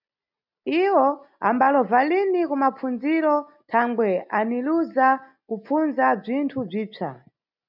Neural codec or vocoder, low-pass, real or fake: none; 5.4 kHz; real